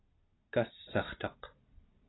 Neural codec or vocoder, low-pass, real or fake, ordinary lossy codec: none; 7.2 kHz; real; AAC, 16 kbps